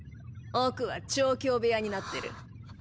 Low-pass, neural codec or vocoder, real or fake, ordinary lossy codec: none; none; real; none